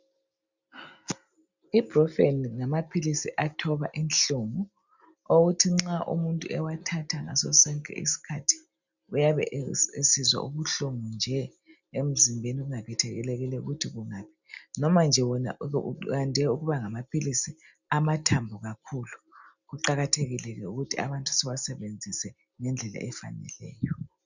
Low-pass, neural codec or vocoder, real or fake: 7.2 kHz; none; real